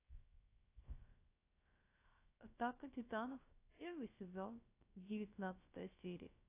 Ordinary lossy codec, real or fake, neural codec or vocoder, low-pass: AAC, 24 kbps; fake; codec, 16 kHz, 0.3 kbps, FocalCodec; 3.6 kHz